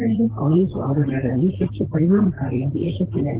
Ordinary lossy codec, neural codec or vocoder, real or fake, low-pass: Opus, 16 kbps; vocoder, 44.1 kHz, 128 mel bands, Pupu-Vocoder; fake; 3.6 kHz